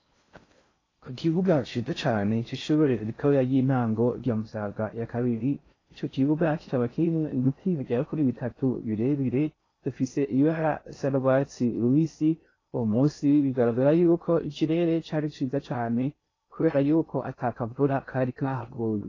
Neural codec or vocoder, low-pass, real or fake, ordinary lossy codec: codec, 16 kHz in and 24 kHz out, 0.6 kbps, FocalCodec, streaming, 4096 codes; 7.2 kHz; fake; AAC, 32 kbps